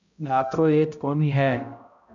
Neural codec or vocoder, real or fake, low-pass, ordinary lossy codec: codec, 16 kHz, 0.5 kbps, X-Codec, HuBERT features, trained on balanced general audio; fake; 7.2 kHz; AAC, 64 kbps